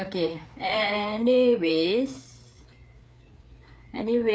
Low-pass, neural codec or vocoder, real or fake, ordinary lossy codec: none; codec, 16 kHz, 4 kbps, FreqCodec, larger model; fake; none